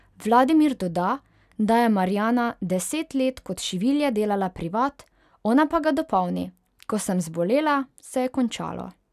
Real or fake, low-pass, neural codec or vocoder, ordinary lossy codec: real; 14.4 kHz; none; none